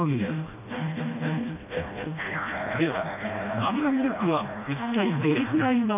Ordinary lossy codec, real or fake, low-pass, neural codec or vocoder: none; fake; 3.6 kHz; codec, 16 kHz, 1 kbps, FreqCodec, smaller model